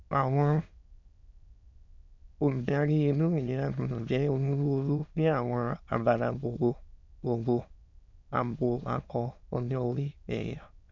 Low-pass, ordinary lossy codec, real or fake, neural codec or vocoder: 7.2 kHz; none; fake; autoencoder, 22.05 kHz, a latent of 192 numbers a frame, VITS, trained on many speakers